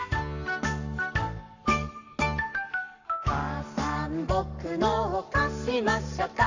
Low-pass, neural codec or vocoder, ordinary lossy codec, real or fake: 7.2 kHz; codec, 44.1 kHz, 7.8 kbps, Pupu-Codec; none; fake